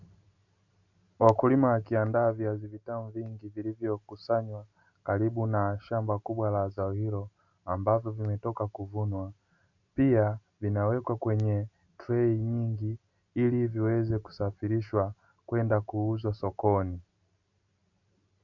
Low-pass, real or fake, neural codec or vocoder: 7.2 kHz; real; none